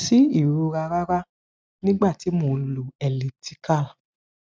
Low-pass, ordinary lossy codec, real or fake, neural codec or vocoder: none; none; real; none